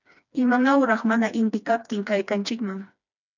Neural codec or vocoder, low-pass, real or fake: codec, 16 kHz, 2 kbps, FreqCodec, smaller model; 7.2 kHz; fake